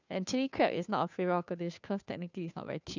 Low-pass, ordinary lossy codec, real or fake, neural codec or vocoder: 7.2 kHz; none; fake; codec, 16 kHz, 2 kbps, FunCodec, trained on Chinese and English, 25 frames a second